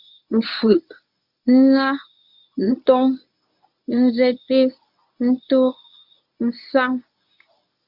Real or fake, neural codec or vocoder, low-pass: fake; codec, 24 kHz, 0.9 kbps, WavTokenizer, medium speech release version 1; 5.4 kHz